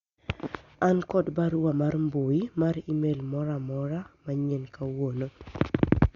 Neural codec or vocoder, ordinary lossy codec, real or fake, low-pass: none; Opus, 64 kbps; real; 7.2 kHz